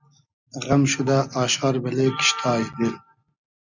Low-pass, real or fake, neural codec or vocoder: 7.2 kHz; real; none